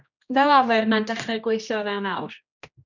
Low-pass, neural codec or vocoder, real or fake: 7.2 kHz; codec, 16 kHz, 1 kbps, X-Codec, HuBERT features, trained on general audio; fake